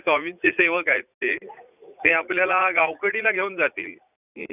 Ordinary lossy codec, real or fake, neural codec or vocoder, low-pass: none; fake; vocoder, 44.1 kHz, 80 mel bands, Vocos; 3.6 kHz